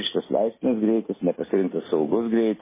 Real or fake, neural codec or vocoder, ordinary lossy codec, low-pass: real; none; MP3, 16 kbps; 3.6 kHz